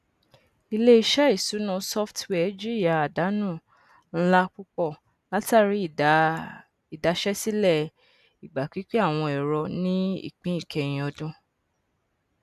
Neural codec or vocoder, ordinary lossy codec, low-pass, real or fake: none; none; 14.4 kHz; real